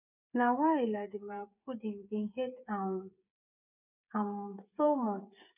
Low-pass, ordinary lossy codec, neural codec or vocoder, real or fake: 3.6 kHz; none; codec, 16 kHz, 8 kbps, FreqCodec, smaller model; fake